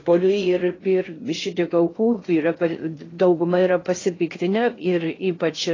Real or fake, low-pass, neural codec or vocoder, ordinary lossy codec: fake; 7.2 kHz; codec, 16 kHz in and 24 kHz out, 0.6 kbps, FocalCodec, streaming, 2048 codes; AAC, 32 kbps